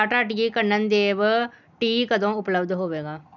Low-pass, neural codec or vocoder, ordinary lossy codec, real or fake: 7.2 kHz; none; none; real